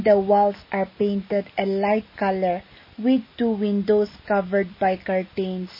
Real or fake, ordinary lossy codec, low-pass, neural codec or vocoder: real; MP3, 24 kbps; 5.4 kHz; none